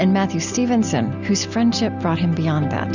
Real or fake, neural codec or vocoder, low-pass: real; none; 7.2 kHz